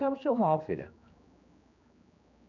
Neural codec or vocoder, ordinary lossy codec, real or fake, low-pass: codec, 16 kHz, 2 kbps, X-Codec, HuBERT features, trained on general audio; none; fake; 7.2 kHz